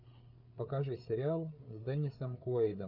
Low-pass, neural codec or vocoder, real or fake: 5.4 kHz; codec, 16 kHz, 8 kbps, FreqCodec, larger model; fake